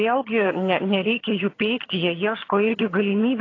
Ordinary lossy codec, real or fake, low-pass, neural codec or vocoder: AAC, 32 kbps; fake; 7.2 kHz; vocoder, 22.05 kHz, 80 mel bands, HiFi-GAN